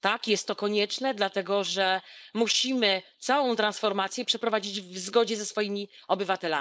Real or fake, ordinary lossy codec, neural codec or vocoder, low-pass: fake; none; codec, 16 kHz, 4.8 kbps, FACodec; none